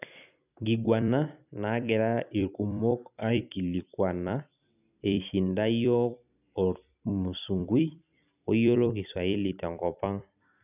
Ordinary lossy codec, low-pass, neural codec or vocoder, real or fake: none; 3.6 kHz; vocoder, 44.1 kHz, 128 mel bands every 256 samples, BigVGAN v2; fake